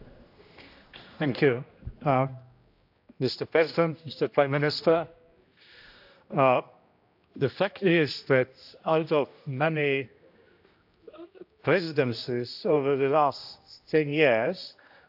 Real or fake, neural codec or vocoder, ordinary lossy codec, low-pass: fake; codec, 16 kHz, 1 kbps, X-Codec, HuBERT features, trained on general audio; AAC, 48 kbps; 5.4 kHz